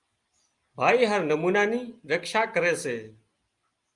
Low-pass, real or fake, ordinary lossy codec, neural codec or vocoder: 10.8 kHz; real; Opus, 32 kbps; none